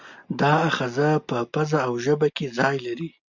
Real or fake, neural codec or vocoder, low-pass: real; none; 7.2 kHz